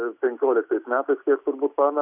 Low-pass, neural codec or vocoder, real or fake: 3.6 kHz; none; real